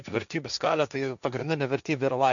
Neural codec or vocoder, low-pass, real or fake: codec, 16 kHz, 1.1 kbps, Voila-Tokenizer; 7.2 kHz; fake